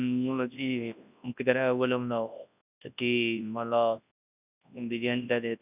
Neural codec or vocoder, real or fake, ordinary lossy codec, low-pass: codec, 24 kHz, 0.9 kbps, WavTokenizer, large speech release; fake; none; 3.6 kHz